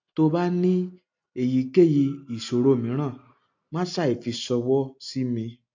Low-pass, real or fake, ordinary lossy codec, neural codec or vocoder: 7.2 kHz; real; AAC, 48 kbps; none